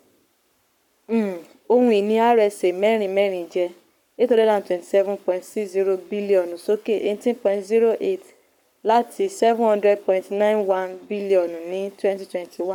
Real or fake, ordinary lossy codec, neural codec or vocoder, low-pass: fake; none; codec, 44.1 kHz, 7.8 kbps, Pupu-Codec; 19.8 kHz